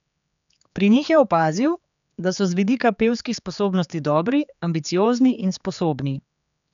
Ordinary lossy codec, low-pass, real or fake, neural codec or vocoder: none; 7.2 kHz; fake; codec, 16 kHz, 4 kbps, X-Codec, HuBERT features, trained on general audio